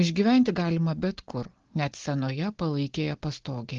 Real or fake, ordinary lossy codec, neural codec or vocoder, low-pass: real; Opus, 16 kbps; none; 7.2 kHz